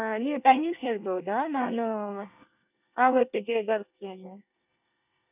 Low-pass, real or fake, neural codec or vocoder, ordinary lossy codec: 3.6 kHz; fake; codec, 24 kHz, 1 kbps, SNAC; none